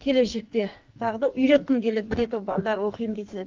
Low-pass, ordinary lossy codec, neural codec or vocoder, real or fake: 7.2 kHz; Opus, 24 kbps; codec, 44.1 kHz, 2.6 kbps, SNAC; fake